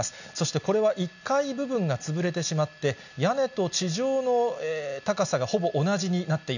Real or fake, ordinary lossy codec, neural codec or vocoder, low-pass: real; none; none; 7.2 kHz